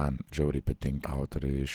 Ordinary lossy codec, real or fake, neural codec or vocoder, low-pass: Opus, 16 kbps; real; none; 19.8 kHz